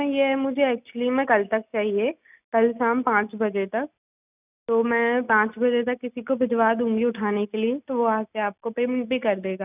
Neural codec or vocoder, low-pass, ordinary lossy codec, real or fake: none; 3.6 kHz; none; real